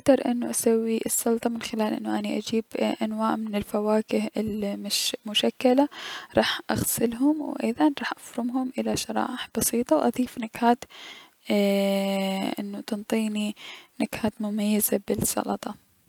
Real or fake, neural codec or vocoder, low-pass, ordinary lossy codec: real; none; 19.8 kHz; none